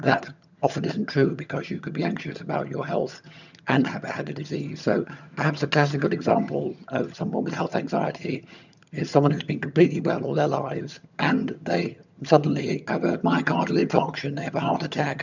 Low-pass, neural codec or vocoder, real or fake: 7.2 kHz; vocoder, 22.05 kHz, 80 mel bands, HiFi-GAN; fake